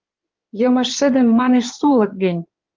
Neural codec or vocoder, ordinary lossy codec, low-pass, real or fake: codec, 16 kHz in and 24 kHz out, 2.2 kbps, FireRedTTS-2 codec; Opus, 32 kbps; 7.2 kHz; fake